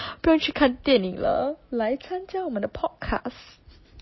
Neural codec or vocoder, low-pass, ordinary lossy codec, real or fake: none; 7.2 kHz; MP3, 24 kbps; real